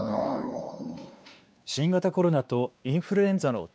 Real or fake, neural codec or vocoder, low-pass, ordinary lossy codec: fake; codec, 16 kHz, 2 kbps, X-Codec, WavLM features, trained on Multilingual LibriSpeech; none; none